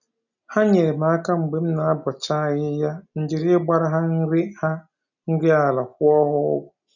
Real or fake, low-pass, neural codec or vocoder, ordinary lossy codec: real; 7.2 kHz; none; none